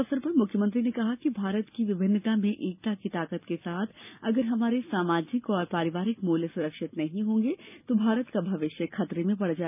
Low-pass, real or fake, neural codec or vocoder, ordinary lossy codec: 3.6 kHz; real; none; none